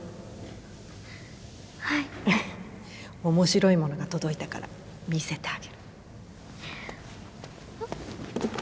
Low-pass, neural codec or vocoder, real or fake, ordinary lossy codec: none; none; real; none